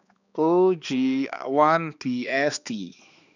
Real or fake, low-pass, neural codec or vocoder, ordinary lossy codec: fake; 7.2 kHz; codec, 16 kHz, 2 kbps, X-Codec, HuBERT features, trained on balanced general audio; none